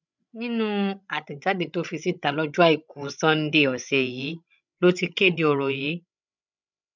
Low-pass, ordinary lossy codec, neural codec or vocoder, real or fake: 7.2 kHz; none; codec, 16 kHz, 16 kbps, FreqCodec, larger model; fake